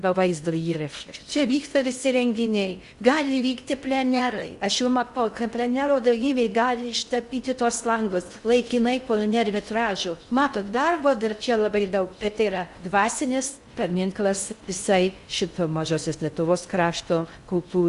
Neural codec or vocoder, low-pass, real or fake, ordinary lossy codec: codec, 16 kHz in and 24 kHz out, 0.6 kbps, FocalCodec, streaming, 2048 codes; 10.8 kHz; fake; Opus, 64 kbps